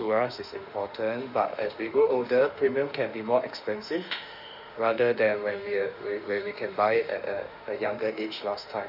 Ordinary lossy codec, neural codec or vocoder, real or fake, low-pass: none; autoencoder, 48 kHz, 32 numbers a frame, DAC-VAE, trained on Japanese speech; fake; 5.4 kHz